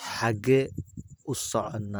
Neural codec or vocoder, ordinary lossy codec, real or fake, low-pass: vocoder, 44.1 kHz, 128 mel bands, Pupu-Vocoder; none; fake; none